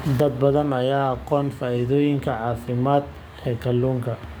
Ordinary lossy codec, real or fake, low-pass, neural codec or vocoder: none; fake; none; codec, 44.1 kHz, 7.8 kbps, DAC